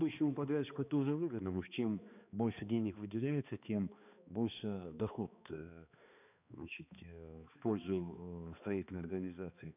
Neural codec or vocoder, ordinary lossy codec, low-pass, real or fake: codec, 16 kHz, 2 kbps, X-Codec, HuBERT features, trained on balanced general audio; none; 3.6 kHz; fake